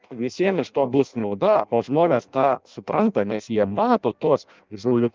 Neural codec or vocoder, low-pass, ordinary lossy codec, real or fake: codec, 16 kHz in and 24 kHz out, 0.6 kbps, FireRedTTS-2 codec; 7.2 kHz; Opus, 32 kbps; fake